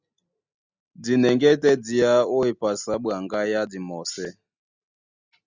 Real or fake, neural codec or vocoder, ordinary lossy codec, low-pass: real; none; Opus, 64 kbps; 7.2 kHz